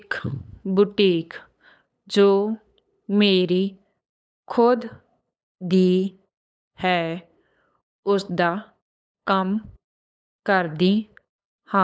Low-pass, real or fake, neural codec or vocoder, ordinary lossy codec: none; fake; codec, 16 kHz, 8 kbps, FunCodec, trained on LibriTTS, 25 frames a second; none